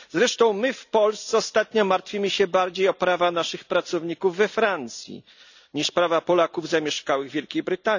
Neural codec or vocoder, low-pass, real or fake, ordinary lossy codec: none; 7.2 kHz; real; none